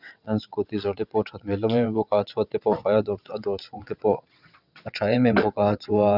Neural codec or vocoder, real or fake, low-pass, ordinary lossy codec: vocoder, 22.05 kHz, 80 mel bands, WaveNeXt; fake; 5.4 kHz; none